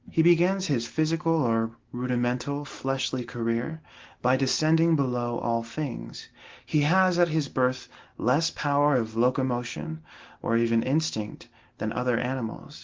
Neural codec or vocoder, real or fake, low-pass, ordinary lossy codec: none; real; 7.2 kHz; Opus, 16 kbps